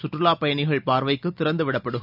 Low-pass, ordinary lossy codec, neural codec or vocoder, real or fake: 5.4 kHz; none; none; real